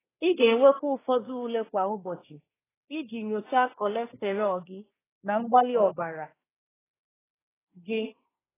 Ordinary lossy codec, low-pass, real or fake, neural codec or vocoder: AAC, 16 kbps; 3.6 kHz; fake; codec, 24 kHz, 1 kbps, SNAC